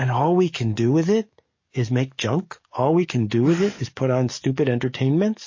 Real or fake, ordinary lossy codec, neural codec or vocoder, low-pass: fake; MP3, 32 kbps; codec, 44.1 kHz, 7.8 kbps, DAC; 7.2 kHz